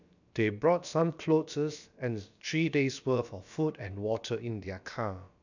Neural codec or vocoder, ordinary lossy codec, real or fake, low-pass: codec, 16 kHz, about 1 kbps, DyCAST, with the encoder's durations; none; fake; 7.2 kHz